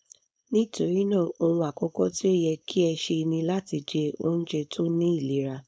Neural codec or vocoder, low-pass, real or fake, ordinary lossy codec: codec, 16 kHz, 4.8 kbps, FACodec; none; fake; none